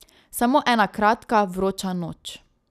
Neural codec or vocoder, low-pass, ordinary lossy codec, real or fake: none; 14.4 kHz; none; real